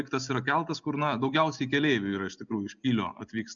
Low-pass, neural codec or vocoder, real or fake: 7.2 kHz; none; real